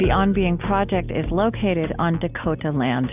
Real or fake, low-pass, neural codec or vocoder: real; 3.6 kHz; none